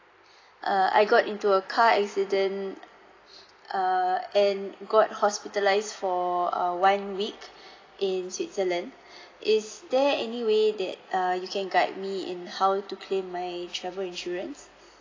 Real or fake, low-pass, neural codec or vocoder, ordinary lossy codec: real; 7.2 kHz; none; AAC, 32 kbps